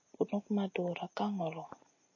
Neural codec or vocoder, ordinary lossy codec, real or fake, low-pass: none; MP3, 32 kbps; real; 7.2 kHz